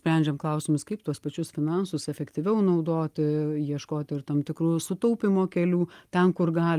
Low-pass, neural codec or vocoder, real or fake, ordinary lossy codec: 14.4 kHz; none; real; Opus, 32 kbps